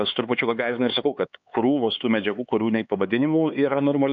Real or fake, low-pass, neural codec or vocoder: fake; 7.2 kHz; codec, 16 kHz, 4 kbps, X-Codec, HuBERT features, trained on LibriSpeech